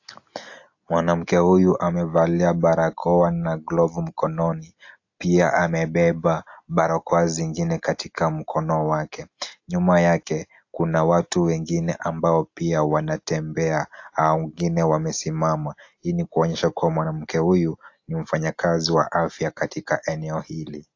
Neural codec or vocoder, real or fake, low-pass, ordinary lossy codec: none; real; 7.2 kHz; AAC, 48 kbps